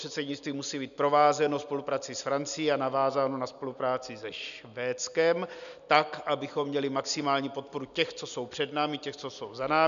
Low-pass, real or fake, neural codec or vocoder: 7.2 kHz; real; none